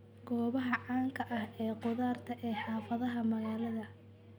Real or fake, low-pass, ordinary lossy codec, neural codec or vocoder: real; none; none; none